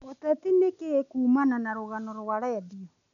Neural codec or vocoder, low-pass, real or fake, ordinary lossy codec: none; 7.2 kHz; real; none